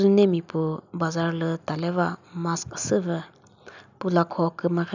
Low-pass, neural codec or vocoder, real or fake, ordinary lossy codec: 7.2 kHz; none; real; none